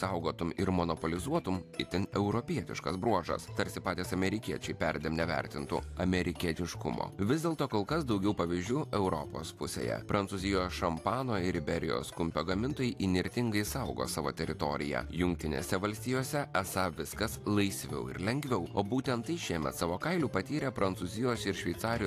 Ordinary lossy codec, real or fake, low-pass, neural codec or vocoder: AAC, 64 kbps; real; 14.4 kHz; none